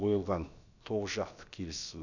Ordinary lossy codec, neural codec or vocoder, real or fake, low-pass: none; codec, 16 kHz, about 1 kbps, DyCAST, with the encoder's durations; fake; 7.2 kHz